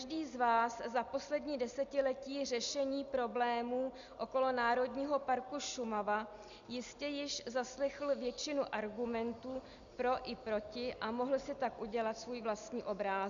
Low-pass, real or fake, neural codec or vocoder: 7.2 kHz; real; none